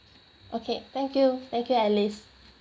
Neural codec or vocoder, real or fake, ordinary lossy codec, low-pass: none; real; none; none